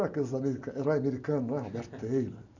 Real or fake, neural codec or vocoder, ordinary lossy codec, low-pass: real; none; none; 7.2 kHz